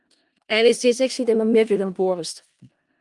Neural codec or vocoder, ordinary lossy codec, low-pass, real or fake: codec, 16 kHz in and 24 kHz out, 0.4 kbps, LongCat-Audio-Codec, four codebook decoder; Opus, 32 kbps; 10.8 kHz; fake